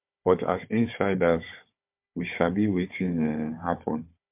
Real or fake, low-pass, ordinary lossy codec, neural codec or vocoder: fake; 3.6 kHz; MP3, 32 kbps; codec, 16 kHz, 4 kbps, FunCodec, trained on Chinese and English, 50 frames a second